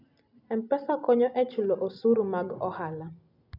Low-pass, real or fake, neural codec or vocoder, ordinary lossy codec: 5.4 kHz; real; none; none